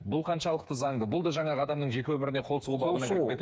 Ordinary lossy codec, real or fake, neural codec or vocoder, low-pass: none; fake; codec, 16 kHz, 4 kbps, FreqCodec, smaller model; none